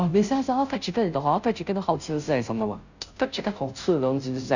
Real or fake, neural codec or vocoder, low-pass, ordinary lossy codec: fake; codec, 16 kHz, 0.5 kbps, FunCodec, trained on Chinese and English, 25 frames a second; 7.2 kHz; none